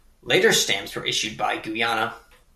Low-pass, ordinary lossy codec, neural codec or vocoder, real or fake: 14.4 kHz; MP3, 96 kbps; none; real